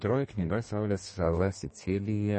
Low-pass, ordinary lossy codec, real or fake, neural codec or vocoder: 10.8 kHz; MP3, 32 kbps; fake; codec, 32 kHz, 1.9 kbps, SNAC